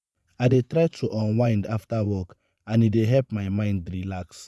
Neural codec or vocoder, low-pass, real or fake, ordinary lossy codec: vocoder, 24 kHz, 100 mel bands, Vocos; none; fake; none